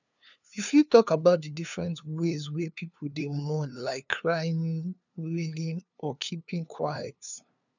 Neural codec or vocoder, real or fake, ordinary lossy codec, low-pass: codec, 16 kHz, 2 kbps, FunCodec, trained on LibriTTS, 25 frames a second; fake; none; 7.2 kHz